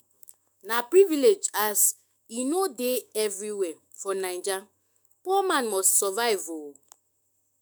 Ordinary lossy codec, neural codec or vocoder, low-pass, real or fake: none; autoencoder, 48 kHz, 128 numbers a frame, DAC-VAE, trained on Japanese speech; none; fake